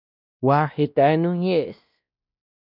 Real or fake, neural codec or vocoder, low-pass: fake; codec, 16 kHz, 1 kbps, X-Codec, WavLM features, trained on Multilingual LibriSpeech; 5.4 kHz